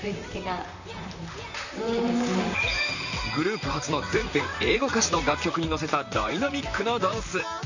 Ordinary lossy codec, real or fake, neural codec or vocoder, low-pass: none; fake; vocoder, 44.1 kHz, 128 mel bands, Pupu-Vocoder; 7.2 kHz